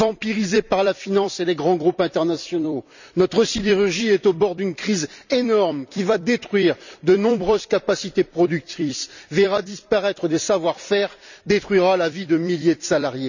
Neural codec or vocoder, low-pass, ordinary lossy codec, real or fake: vocoder, 44.1 kHz, 128 mel bands every 256 samples, BigVGAN v2; 7.2 kHz; none; fake